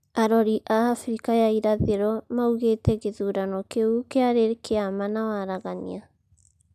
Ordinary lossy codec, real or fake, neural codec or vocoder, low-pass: none; real; none; 14.4 kHz